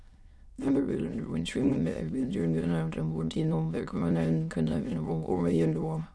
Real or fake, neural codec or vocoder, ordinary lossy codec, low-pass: fake; autoencoder, 22.05 kHz, a latent of 192 numbers a frame, VITS, trained on many speakers; none; none